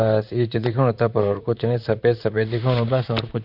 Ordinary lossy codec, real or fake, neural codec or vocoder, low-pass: none; fake; vocoder, 44.1 kHz, 128 mel bands, Pupu-Vocoder; 5.4 kHz